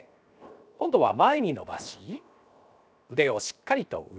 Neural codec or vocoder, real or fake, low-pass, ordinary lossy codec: codec, 16 kHz, 0.7 kbps, FocalCodec; fake; none; none